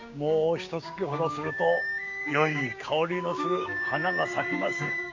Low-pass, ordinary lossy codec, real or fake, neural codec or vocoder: 7.2 kHz; MP3, 64 kbps; fake; codec, 16 kHz, 6 kbps, DAC